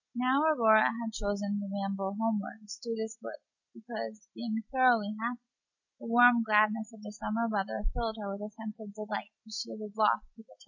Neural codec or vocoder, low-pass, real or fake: none; 7.2 kHz; real